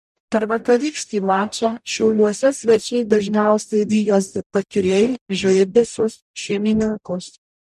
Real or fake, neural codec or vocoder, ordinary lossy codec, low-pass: fake; codec, 44.1 kHz, 0.9 kbps, DAC; MP3, 96 kbps; 14.4 kHz